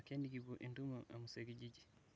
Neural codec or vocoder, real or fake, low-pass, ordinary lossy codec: none; real; none; none